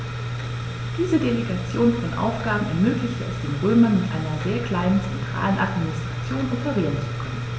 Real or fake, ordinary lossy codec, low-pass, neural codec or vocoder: real; none; none; none